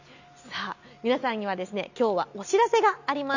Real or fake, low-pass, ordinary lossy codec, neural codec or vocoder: real; 7.2 kHz; none; none